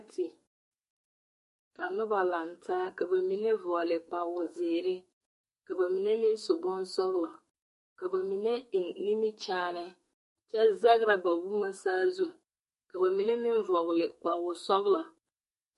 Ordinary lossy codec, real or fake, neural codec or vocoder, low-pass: MP3, 48 kbps; fake; codec, 44.1 kHz, 2.6 kbps, SNAC; 14.4 kHz